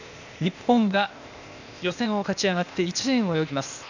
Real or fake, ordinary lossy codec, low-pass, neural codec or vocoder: fake; none; 7.2 kHz; codec, 16 kHz, 0.8 kbps, ZipCodec